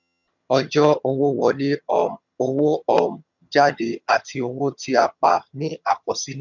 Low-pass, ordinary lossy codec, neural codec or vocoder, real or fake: 7.2 kHz; none; vocoder, 22.05 kHz, 80 mel bands, HiFi-GAN; fake